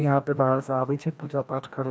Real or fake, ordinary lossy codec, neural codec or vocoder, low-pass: fake; none; codec, 16 kHz, 1 kbps, FreqCodec, larger model; none